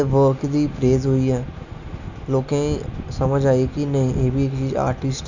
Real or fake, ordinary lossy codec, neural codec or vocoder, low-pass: real; none; none; 7.2 kHz